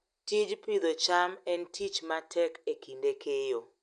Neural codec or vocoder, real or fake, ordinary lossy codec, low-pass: none; real; none; 9.9 kHz